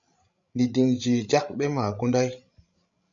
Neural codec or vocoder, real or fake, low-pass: codec, 16 kHz, 16 kbps, FreqCodec, larger model; fake; 7.2 kHz